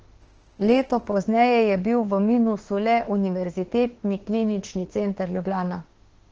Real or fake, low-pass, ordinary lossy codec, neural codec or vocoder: fake; 7.2 kHz; Opus, 16 kbps; autoencoder, 48 kHz, 32 numbers a frame, DAC-VAE, trained on Japanese speech